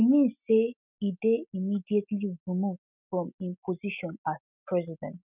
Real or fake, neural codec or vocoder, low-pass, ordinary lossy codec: real; none; 3.6 kHz; none